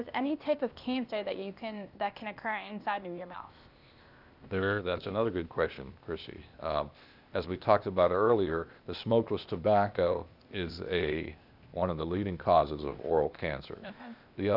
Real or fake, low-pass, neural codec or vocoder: fake; 5.4 kHz; codec, 16 kHz, 0.8 kbps, ZipCodec